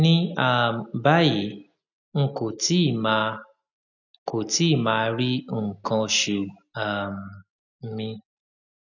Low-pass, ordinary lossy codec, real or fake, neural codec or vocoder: 7.2 kHz; none; real; none